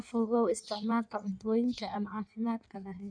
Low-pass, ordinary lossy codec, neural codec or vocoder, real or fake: 9.9 kHz; none; codec, 16 kHz in and 24 kHz out, 1.1 kbps, FireRedTTS-2 codec; fake